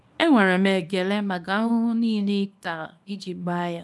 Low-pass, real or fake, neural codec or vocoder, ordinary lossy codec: none; fake; codec, 24 kHz, 0.9 kbps, WavTokenizer, small release; none